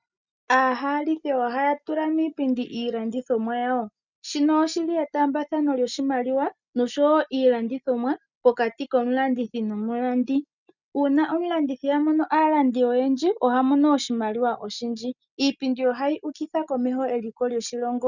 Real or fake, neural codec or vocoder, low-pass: real; none; 7.2 kHz